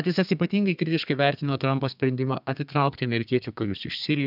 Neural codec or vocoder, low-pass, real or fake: codec, 32 kHz, 1.9 kbps, SNAC; 5.4 kHz; fake